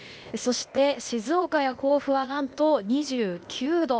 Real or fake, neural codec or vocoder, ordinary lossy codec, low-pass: fake; codec, 16 kHz, 0.8 kbps, ZipCodec; none; none